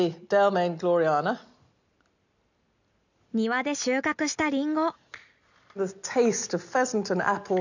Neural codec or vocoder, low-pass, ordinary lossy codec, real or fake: none; 7.2 kHz; none; real